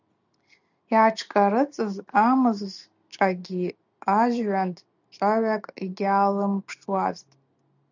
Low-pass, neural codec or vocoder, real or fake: 7.2 kHz; none; real